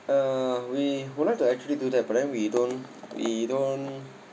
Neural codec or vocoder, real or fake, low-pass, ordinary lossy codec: none; real; none; none